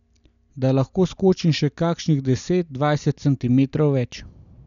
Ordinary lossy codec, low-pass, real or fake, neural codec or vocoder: none; 7.2 kHz; real; none